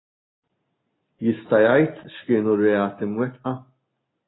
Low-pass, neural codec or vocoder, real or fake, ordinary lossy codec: 7.2 kHz; none; real; AAC, 16 kbps